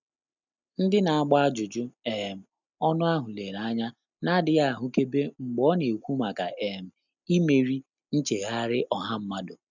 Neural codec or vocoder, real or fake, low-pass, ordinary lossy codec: none; real; 7.2 kHz; none